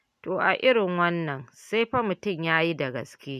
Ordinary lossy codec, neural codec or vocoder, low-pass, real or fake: none; none; 14.4 kHz; real